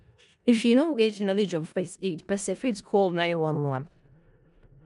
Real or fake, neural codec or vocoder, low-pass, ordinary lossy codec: fake; codec, 16 kHz in and 24 kHz out, 0.4 kbps, LongCat-Audio-Codec, four codebook decoder; 10.8 kHz; none